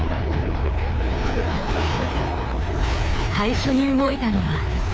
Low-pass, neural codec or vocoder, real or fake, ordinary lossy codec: none; codec, 16 kHz, 2 kbps, FreqCodec, larger model; fake; none